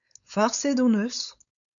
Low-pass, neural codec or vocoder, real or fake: 7.2 kHz; codec, 16 kHz, 4.8 kbps, FACodec; fake